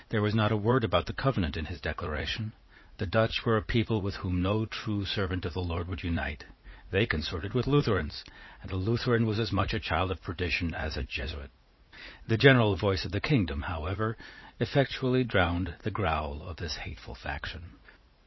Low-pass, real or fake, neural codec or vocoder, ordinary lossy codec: 7.2 kHz; fake; vocoder, 44.1 kHz, 80 mel bands, Vocos; MP3, 24 kbps